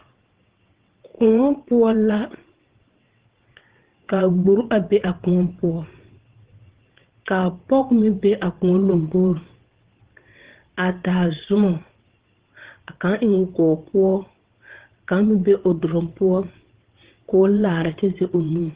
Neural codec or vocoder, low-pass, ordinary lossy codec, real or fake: vocoder, 22.05 kHz, 80 mel bands, WaveNeXt; 3.6 kHz; Opus, 16 kbps; fake